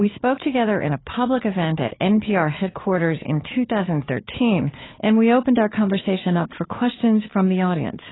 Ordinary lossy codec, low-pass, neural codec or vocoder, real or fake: AAC, 16 kbps; 7.2 kHz; codec, 16 kHz, 4 kbps, FunCodec, trained on Chinese and English, 50 frames a second; fake